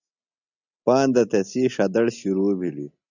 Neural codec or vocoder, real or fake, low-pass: none; real; 7.2 kHz